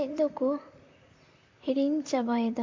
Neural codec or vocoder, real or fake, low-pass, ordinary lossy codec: vocoder, 44.1 kHz, 128 mel bands, Pupu-Vocoder; fake; 7.2 kHz; MP3, 48 kbps